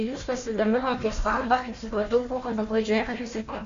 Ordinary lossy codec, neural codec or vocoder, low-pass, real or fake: AAC, 64 kbps; codec, 16 kHz, 1 kbps, FunCodec, trained on Chinese and English, 50 frames a second; 7.2 kHz; fake